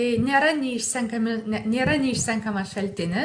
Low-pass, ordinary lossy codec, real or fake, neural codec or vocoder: 9.9 kHz; AAC, 64 kbps; real; none